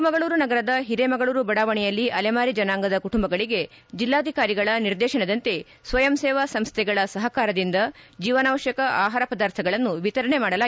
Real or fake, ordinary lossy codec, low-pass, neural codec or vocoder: real; none; none; none